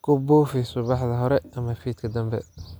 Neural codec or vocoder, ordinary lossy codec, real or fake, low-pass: none; none; real; none